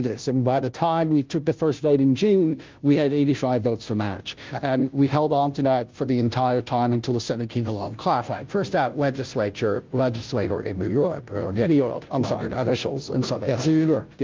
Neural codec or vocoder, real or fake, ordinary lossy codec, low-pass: codec, 16 kHz, 0.5 kbps, FunCodec, trained on Chinese and English, 25 frames a second; fake; Opus, 24 kbps; 7.2 kHz